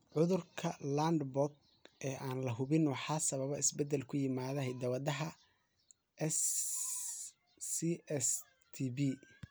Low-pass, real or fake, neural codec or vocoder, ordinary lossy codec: none; real; none; none